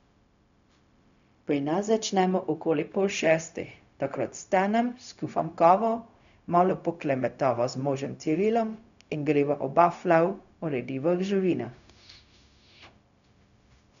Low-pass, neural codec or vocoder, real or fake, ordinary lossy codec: 7.2 kHz; codec, 16 kHz, 0.4 kbps, LongCat-Audio-Codec; fake; none